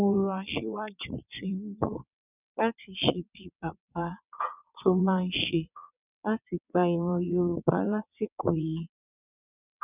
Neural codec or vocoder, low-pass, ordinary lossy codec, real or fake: vocoder, 22.05 kHz, 80 mel bands, WaveNeXt; 3.6 kHz; none; fake